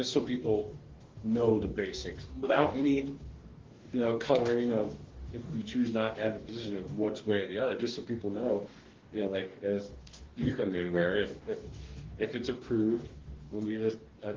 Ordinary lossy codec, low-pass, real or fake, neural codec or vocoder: Opus, 16 kbps; 7.2 kHz; fake; codec, 44.1 kHz, 2.6 kbps, DAC